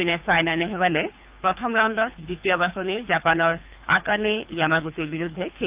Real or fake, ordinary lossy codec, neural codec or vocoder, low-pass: fake; Opus, 24 kbps; codec, 24 kHz, 3 kbps, HILCodec; 3.6 kHz